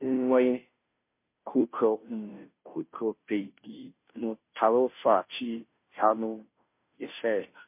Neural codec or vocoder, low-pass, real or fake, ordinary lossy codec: codec, 16 kHz, 0.5 kbps, FunCodec, trained on Chinese and English, 25 frames a second; 3.6 kHz; fake; MP3, 24 kbps